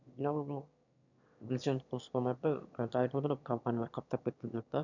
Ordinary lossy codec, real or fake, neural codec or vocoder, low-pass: none; fake; autoencoder, 22.05 kHz, a latent of 192 numbers a frame, VITS, trained on one speaker; 7.2 kHz